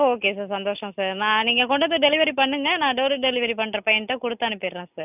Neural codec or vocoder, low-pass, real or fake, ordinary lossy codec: none; 3.6 kHz; real; none